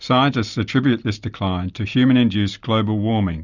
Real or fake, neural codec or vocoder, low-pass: real; none; 7.2 kHz